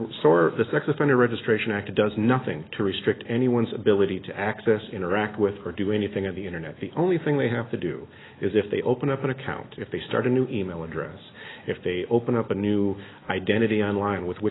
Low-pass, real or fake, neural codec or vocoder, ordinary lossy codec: 7.2 kHz; real; none; AAC, 16 kbps